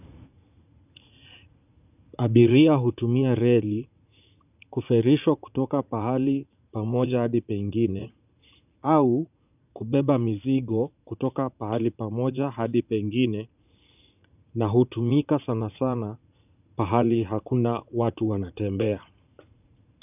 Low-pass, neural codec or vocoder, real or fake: 3.6 kHz; vocoder, 44.1 kHz, 80 mel bands, Vocos; fake